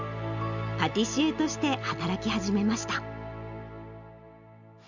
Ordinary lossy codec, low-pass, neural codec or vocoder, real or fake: none; 7.2 kHz; none; real